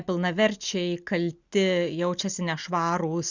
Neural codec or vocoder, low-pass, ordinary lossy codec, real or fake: none; 7.2 kHz; Opus, 64 kbps; real